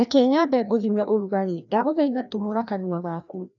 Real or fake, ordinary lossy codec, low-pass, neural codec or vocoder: fake; none; 7.2 kHz; codec, 16 kHz, 1 kbps, FreqCodec, larger model